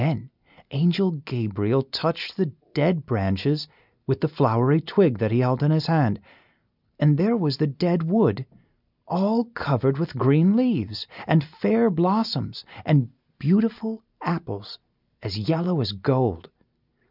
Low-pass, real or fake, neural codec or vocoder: 5.4 kHz; real; none